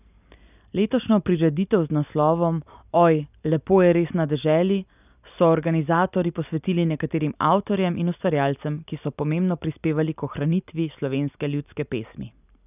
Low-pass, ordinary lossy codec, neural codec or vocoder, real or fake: 3.6 kHz; none; none; real